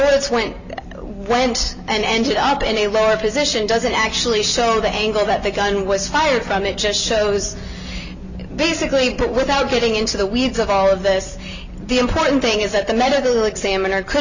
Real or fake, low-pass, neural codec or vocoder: real; 7.2 kHz; none